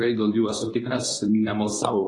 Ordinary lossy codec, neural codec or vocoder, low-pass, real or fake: AAC, 32 kbps; codec, 24 kHz, 0.9 kbps, WavTokenizer, medium speech release version 2; 10.8 kHz; fake